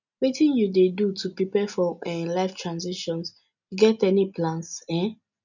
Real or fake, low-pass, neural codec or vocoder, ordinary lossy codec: real; 7.2 kHz; none; none